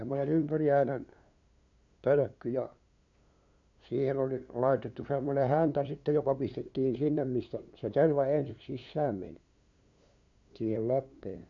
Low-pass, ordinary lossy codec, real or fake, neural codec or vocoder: 7.2 kHz; AAC, 64 kbps; fake; codec, 16 kHz, 2 kbps, FunCodec, trained on LibriTTS, 25 frames a second